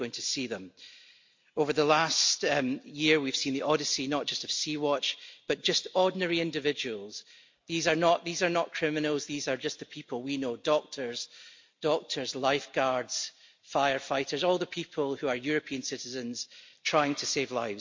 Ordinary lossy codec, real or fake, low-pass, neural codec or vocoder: MP3, 48 kbps; real; 7.2 kHz; none